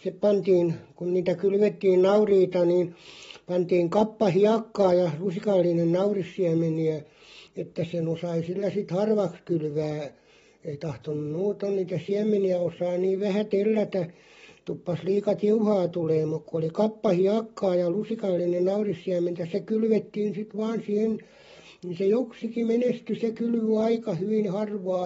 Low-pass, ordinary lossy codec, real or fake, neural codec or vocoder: 19.8 kHz; AAC, 24 kbps; real; none